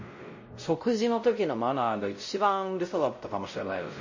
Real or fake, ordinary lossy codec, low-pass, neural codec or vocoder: fake; MP3, 32 kbps; 7.2 kHz; codec, 16 kHz, 0.5 kbps, X-Codec, WavLM features, trained on Multilingual LibriSpeech